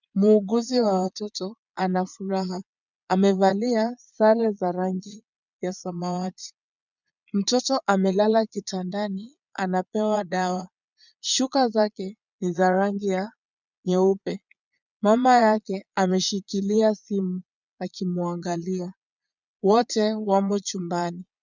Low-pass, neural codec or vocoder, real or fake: 7.2 kHz; vocoder, 22.05 kHz, 80 mel bands, Vocos; fake